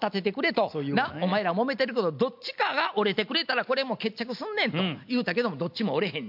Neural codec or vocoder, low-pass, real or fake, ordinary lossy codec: none; 5.4 kHz; real; MP3, 48 kbps